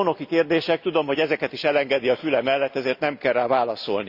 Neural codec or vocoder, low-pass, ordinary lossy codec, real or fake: none; 5.4 kHz; Opus, 64 kbps; real